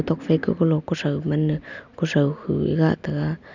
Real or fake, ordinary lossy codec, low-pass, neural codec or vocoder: real; none; 7.2 kHz; none